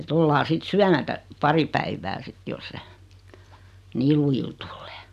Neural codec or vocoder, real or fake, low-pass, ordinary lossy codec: none; real; 14.4 kHz; none